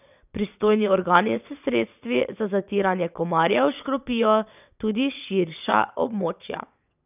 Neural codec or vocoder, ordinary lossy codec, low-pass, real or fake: vocoder, 22.05 kHz, 80 mel bands, WaveNeXt; none; 3.6 kHz; fake